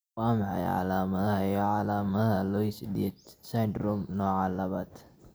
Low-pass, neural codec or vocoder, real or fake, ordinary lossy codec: none; none; real; none